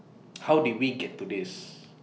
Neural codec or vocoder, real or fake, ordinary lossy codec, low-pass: none; real; none; none